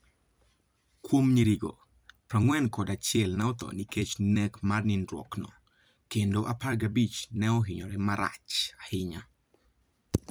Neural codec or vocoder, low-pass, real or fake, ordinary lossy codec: vocoder, 44.1 kHz, 128 mel bands every 256 samples, BigVGAN v2; none; fake; none